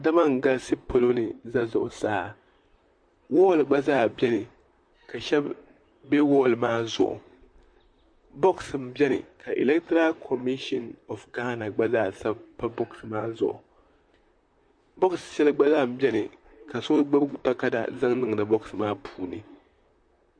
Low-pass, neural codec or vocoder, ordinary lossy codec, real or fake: 9.9 kHz; vocoder, 44.1 kHz, 128 mel bands, Pupu-Vocoder; MP3, 48 kbps; fake